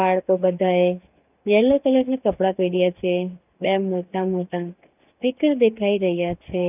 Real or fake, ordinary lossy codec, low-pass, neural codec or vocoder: real; none; 3.6 kHz; none